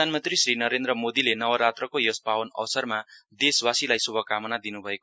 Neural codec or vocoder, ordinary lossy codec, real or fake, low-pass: none; none; real; none